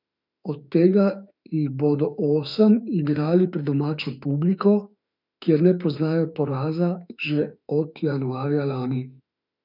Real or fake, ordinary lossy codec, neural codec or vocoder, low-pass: fake; none; autoencoder, 48 kHz, 32 numbers a frame, DAC-VAE, trained on Japanese speech; 5.4 kHz